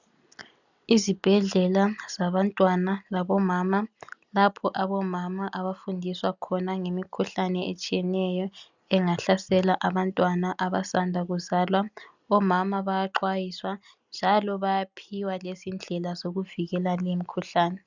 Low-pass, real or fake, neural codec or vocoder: 7.2 kHz; real; none